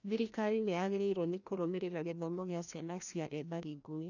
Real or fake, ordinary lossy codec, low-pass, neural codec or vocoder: fake; none; 7.2 kHz; codec, 16 kHz, 1 kbps, FreqCodec, larger model